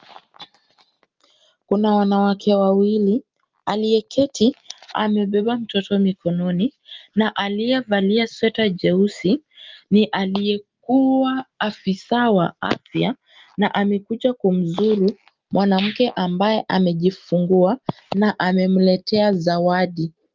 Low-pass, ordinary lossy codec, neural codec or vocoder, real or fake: 7.2 kHz; Opus, 24 kbps; none; real